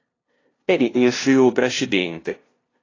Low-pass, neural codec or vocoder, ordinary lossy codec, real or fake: 7.2 kHz; codec, 16 kHz, 0.5 kbps, FunCodec, trained on LibriTTS, 25 frames a second; AAC, 32 kbps; fake